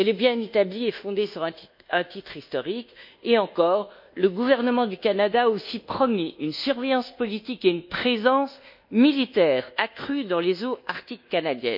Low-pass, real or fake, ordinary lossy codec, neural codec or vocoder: 5.4 kHz; fake; MP3, 48 kbps; codec, 24 kHz, 1.2 kbps, DualCodec